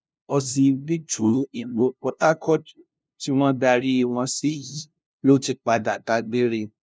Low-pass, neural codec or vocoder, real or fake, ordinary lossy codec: none; codec, 16 kHz, 0.5 kbps, FunCodec, trained on LibriTTS, 25 frames a second; fake; none